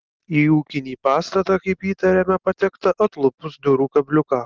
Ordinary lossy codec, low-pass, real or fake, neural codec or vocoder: Opus, 16 kbps; 7.2 kHz; real; none